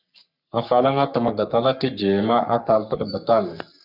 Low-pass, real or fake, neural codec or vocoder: 5.4 kHz; fake; codec, 44.1 kHz, 3.4 kbps, Pupu-Codec